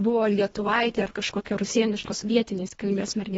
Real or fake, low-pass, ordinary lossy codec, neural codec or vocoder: fake; 10.8 kHz; AAC, 24 kbps; codec, 24 kHz, 1.5 kbps, HILCodec